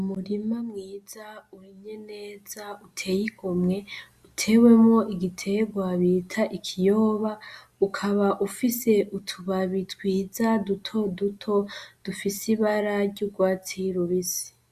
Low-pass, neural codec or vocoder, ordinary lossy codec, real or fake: 14.4 kHz; none; Opus, 64 kbps; real